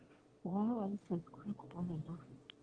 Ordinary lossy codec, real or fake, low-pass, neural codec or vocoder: Opus, 16 kbps; fake; 9.9 kHz; autoencoder, 22.05 kHz, a latent of 192 numbers a frame, VITS, trained on one speaker